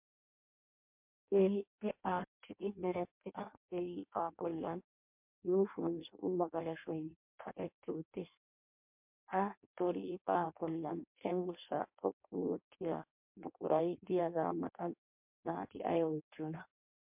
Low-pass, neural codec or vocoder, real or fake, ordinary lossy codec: 3.6 kHz; codec, 16 kHz in and 24 kHz out, 0.6 kbps, FireRedTTS-2 codec; fake; MP3, 32 kbps